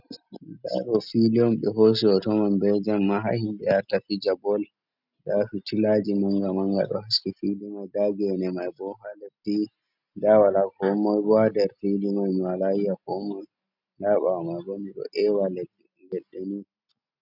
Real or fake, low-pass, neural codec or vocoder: real; 5.4 kHz; none